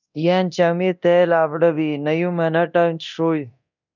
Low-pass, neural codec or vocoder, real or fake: 7.2 kHz; codec, 24 kHz, 0.9 kbps, DualCodec; fake